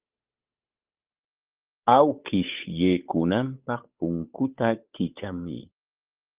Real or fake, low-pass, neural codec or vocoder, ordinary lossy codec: fake; 3.6 kHz; codec, 16 kHz, 8 kbps, FunCodec, trained on Chinese and English, 25 frames a second; Opus, 32 kbps